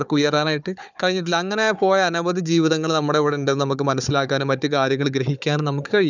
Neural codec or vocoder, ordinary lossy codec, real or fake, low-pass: codec, 16 kHz, 4 kbps, FunCodec, trained on Chinese and English, 50 frames a second; none; fake; 7.2 kHz